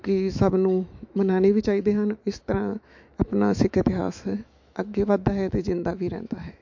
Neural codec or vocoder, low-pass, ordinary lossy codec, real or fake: autoencoder, 48 kHz, 128 numbers a frame, DAC-VAE, trained on Japanese speech; 7.2 kHz; MP3, 48 kbps; fake